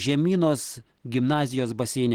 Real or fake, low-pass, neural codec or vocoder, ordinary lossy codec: real; 19.8 kHz; none; Opus, 16 kbps